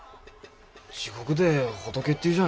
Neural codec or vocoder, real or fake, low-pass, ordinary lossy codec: none; real; none; none